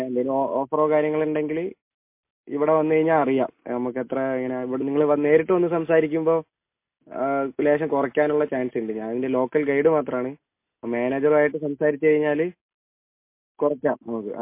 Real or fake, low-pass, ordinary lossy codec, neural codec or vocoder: real; 3.6 kHz; MP3, 24 kbps; none